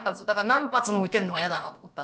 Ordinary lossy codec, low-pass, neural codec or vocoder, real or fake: none; none; codec, 16 kHz, about 1 kbps, DyCAST, with the encoder's durations; fake